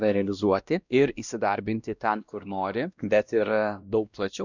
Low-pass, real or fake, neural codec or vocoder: 7.2 kHz; fake; codec, 16 kHz, 1 kbps, X-Codec, WavLM features, trained on Multilingual LibriSpeech